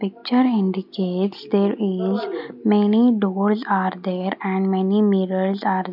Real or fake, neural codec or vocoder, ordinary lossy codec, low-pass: real; none; none; 5.4 kHz